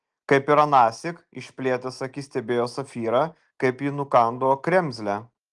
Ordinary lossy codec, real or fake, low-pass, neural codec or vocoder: Opus, 24 kbps; real; 10.8 kHz; none